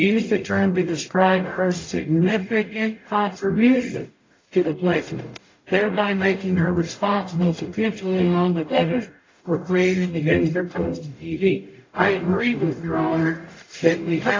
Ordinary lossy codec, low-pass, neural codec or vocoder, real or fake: AAC, 32 kbps; 7.2 kHz; codec, 44.1 kHz, 0.9 kbps, DAC; fake